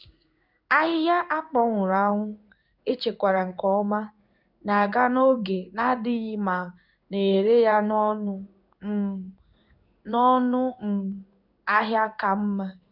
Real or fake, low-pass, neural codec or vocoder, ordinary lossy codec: fake; 5.4 kHz; codec, 16 kHz in and 24 kHz out, 1 kbps, XY-Tokenizer; none